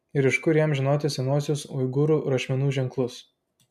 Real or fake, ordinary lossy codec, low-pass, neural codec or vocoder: real; MP3, 96 kbps; 14.4 kHz; none